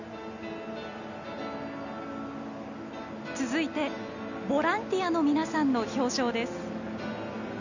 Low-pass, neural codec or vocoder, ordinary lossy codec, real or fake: 7.2 kHz; none; none; real